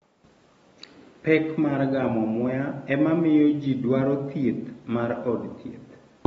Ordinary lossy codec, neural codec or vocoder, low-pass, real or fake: AAC, 24 kbps; none; 19.8 kHz; real